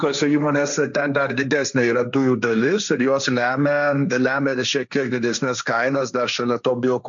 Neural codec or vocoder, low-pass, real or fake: codec, 16 kHz, 1.1 kbps, Voila-Tokenizer; 7.2 kHz; fake